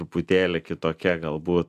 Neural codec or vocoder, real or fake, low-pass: none; real; 14.4 kHz